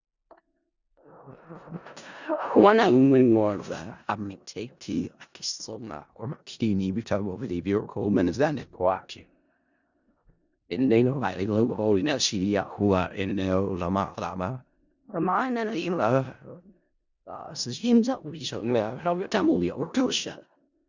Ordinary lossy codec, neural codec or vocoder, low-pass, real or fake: Opus, 64 kbps; codec, 16 kHz in and 24 kHz out, 0.4 kbps, LongCat-Audio-Codec, four codebook decoder; 7.2 kHz; fake